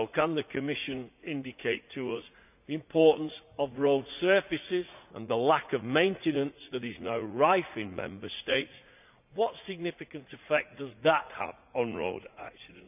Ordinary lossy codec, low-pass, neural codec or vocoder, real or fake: none; 3.6 kHz; vocoder, 44.1 kHz, 80 mel bands, Vocos; fake